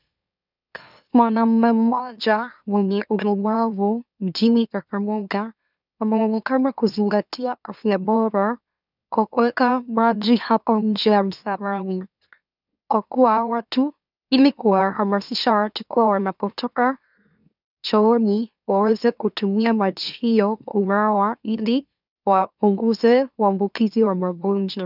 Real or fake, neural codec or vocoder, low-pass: fake; autoencoder, 44.1 kHz, a latent of 192 numbers a frame, MeloTTS; 5.4 kHz